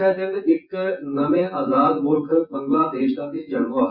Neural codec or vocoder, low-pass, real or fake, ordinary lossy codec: vocoder, 24 kHz, 100 mel bands, Vocos; 5.4 kHz; fake; Opus, 64 kbps